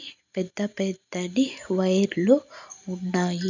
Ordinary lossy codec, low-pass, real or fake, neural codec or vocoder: none; 7.2 kHz; real; none